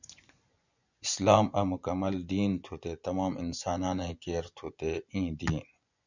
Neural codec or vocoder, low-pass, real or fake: vocoder, 24 kHz, 100 mel bands, Vocos; 7.2 kHz; fake